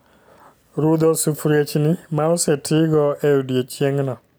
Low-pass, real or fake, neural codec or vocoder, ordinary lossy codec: none; real; none; none